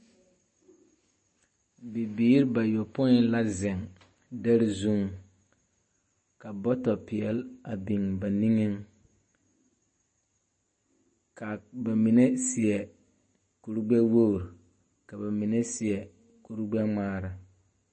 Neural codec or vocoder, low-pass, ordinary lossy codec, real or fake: none; 9.9 kHz; MP3, 32 kbps; real